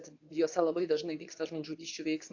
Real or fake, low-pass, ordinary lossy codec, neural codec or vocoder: fake; 7.2 kHz; AAC, 48 kbps; codec, 24 kHz, 0.9 kbps, WavTokenizer, medium speech release version 2